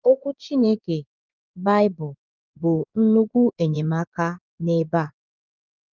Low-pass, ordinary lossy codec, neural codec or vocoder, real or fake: 7.2 kHz; Opus, 24 kbps; vocoder, 24 kHz, 100 mel bands, Vocos; fake